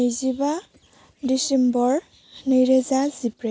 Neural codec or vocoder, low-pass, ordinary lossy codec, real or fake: none; none; none; real